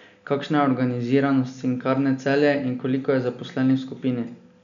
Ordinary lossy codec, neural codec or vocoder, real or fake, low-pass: none; none; real; 7.2 kHz